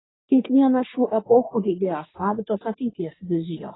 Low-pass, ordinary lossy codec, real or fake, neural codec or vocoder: 7.2 kHz; AAC, 16 kbps; fake; codec, 44.1 kHz, 3.4 kbps, Pupu-Codec